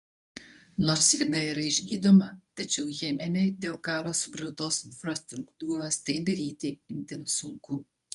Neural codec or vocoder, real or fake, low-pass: codec, 24 kHz, 0.9 kbps, WavTokenizer, medium speech release version 1; fake; 10.8 kHz